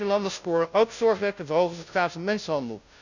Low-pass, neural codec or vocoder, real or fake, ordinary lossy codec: 7.2 kHz; codec, 16 kHz, 0.5 kbps, FunCodec, trained on Chinese and English, 25 frames a second; fake; none